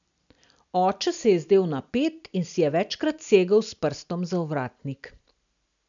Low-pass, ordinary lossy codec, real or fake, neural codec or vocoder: 7.2 kHz; none; real; none